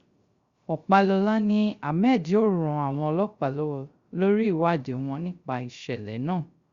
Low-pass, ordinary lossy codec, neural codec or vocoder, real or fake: 7.2 kHz; Opus, 64 kbps; codec, 16 kHz, 0.3 kbps, FocalCodec; fake